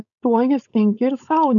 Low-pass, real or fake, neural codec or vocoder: 7.2 kHz; fake; codec, 16 kHz, 4.8 kbps, FACodec